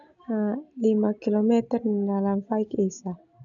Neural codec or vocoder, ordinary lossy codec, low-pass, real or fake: none; none; 7.2 kHz; real